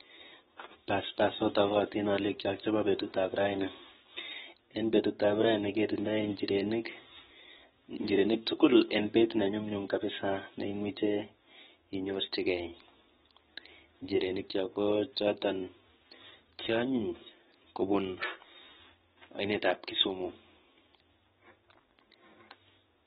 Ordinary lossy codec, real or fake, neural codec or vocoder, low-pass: AAC, 16 kbps; fake; codec, 16 kHz, 6 kbps, DAC; 7.2 kHz